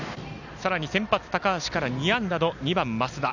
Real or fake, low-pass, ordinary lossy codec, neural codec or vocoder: real; 7.2 kHz; none; none